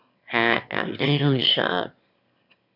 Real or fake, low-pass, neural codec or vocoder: fake; 5.4 kHz; autoencoder, 22.05 kHz, a latent of 192 numbers a frame, VITS, trained on one speaker